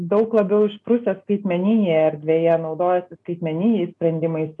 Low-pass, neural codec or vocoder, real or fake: 10.8 kHz; none; real